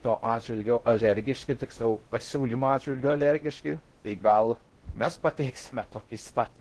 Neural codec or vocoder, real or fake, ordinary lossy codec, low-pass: codec, 16 kHz in and 24 kHz out, 0.6 kbps, FocalCodec, streaming, 2048 codes; fake; Opus, 16 kbps; 10.8 kHz